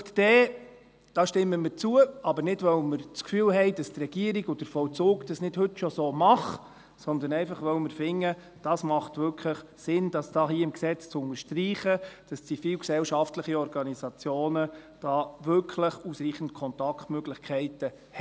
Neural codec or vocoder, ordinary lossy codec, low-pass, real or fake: none; none; none; real